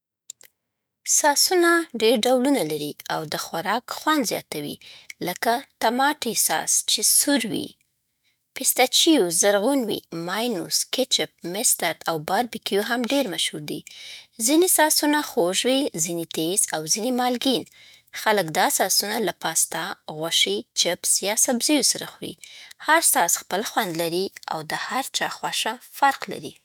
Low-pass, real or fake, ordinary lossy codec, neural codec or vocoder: none; fake; none; autoencoder, 48 kHz, 128 numbers a frame, DAC-VAE, trained on Japanese speech